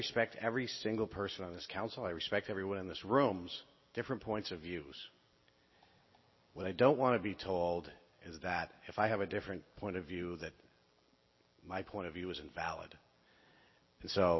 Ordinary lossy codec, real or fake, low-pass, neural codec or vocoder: MP3, 24 kbps; real; 7.2 kHz; none